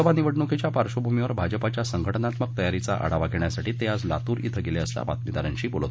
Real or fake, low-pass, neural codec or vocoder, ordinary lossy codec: real; none; none; none